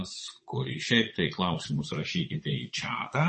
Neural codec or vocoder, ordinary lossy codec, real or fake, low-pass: vocoder, 22.05 kHz, 80 mel bands, Vocos; MP3, 32 kbps; fake; 9.9 kHz